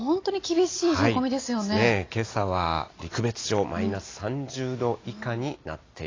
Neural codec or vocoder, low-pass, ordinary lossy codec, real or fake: none; 7.2 kHz; AAC, 32 kbps; real